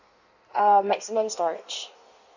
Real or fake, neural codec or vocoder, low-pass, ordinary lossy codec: fake; codec, 16 kHz in and 24 kHz out, 1.1 kbps, FireRedTTS-2 codec; 7.2 kHz; none